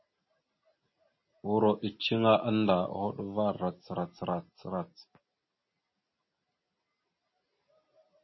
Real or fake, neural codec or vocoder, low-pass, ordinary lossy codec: real; none; 7.2 kHz; MP3, 24 kbps